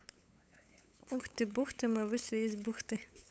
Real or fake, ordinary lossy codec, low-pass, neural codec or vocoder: fake; none; none; codec, 16 kHz, 8 kbps, FunCodec, trained on LibriTTS, 25 frames a second